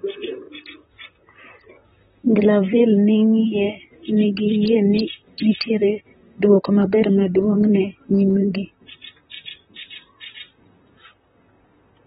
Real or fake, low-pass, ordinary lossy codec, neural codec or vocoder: fake; 19.8 kHz; AAC, 16 kbps; vocoder, 44.1 kHz, 128 mel bands, Pupu-Vocoder